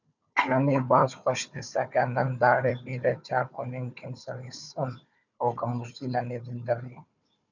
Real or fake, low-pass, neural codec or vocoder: fake; 7.2 kHz; codec, 16 kHz, 4 kbps, FunCodec, trained on Chinese and English, 50 frames a second